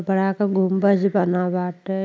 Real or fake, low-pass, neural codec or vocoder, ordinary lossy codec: real; none; none; none